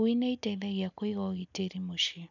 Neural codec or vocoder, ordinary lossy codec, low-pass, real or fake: none; none; 7.2 kHz; real